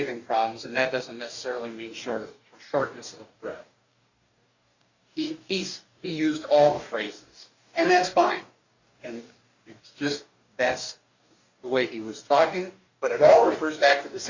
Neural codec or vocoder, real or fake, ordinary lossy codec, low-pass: codec, 44.1 kHz, 2.6 kbps, DAC; fake; Opus, 64 kbps; 7.2 kHz